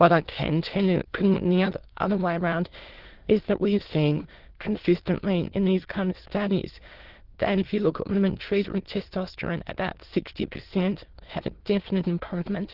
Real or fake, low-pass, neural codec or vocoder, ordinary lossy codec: fake; 5.4 kHz; autoencoder, 22.05 kHz, a latent of 192 numbers a frame, VITS, trained on many speakers; Opus, 16 kbps